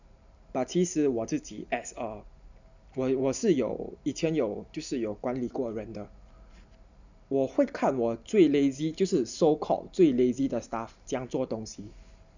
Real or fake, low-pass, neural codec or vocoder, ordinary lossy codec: real; 7.2 kHz; none; none